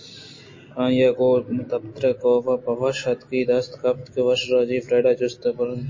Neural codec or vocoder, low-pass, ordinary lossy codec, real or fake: none; 7.2 kHz; MP3, 32 kbps; real